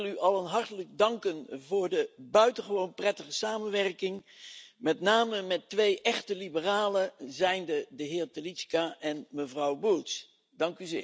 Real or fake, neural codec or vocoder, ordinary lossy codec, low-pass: real; none; none; none